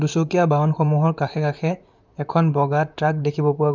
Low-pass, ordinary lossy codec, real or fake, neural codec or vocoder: 7.2 kHz; none; fake; vocoder, 44.1 kHz, 128 mel bands, Pupu-Vocoder